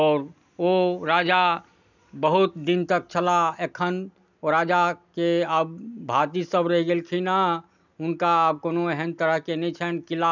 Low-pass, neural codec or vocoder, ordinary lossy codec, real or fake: 7.2 kHz; none; none; real